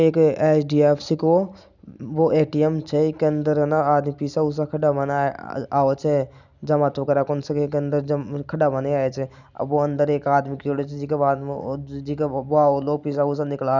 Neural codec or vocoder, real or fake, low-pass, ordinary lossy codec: none; real; 7.2 kHz; none